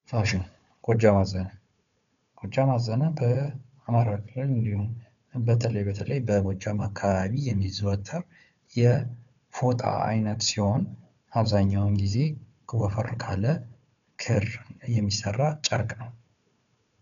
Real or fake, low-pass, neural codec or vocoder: fake; 7.2 kHz; codec, 16 kHz, 4 kbps, FunCodec, trained on Chinese and English, 50 frames a second